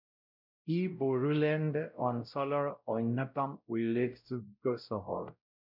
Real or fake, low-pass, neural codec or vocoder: fake; 5.4 kHz; codec, 16 kHz, 0.5 kbps, X-Codec, WavLM features, trained on Multilingual LibriSpeech